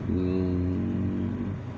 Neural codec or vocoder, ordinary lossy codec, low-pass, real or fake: none; none; none; real